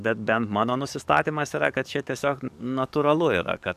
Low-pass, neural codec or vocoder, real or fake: 14.4 kHz; codec, 44.1 kHz, 7.8 kbps, DAC; fake